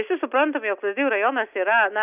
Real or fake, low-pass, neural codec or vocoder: real; 3.6 kHz; none